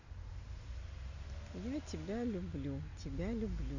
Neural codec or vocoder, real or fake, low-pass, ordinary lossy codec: none; real; 7.2 kHz; Opus, 64 kbps